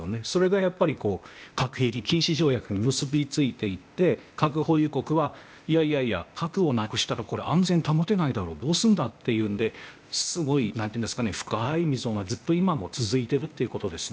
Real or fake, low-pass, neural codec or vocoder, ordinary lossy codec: fake; none; codec, 16 kHz, 0.8 kbps, ZipCodec; none